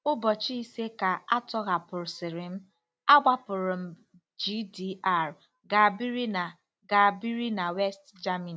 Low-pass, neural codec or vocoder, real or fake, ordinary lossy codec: none; none; real; none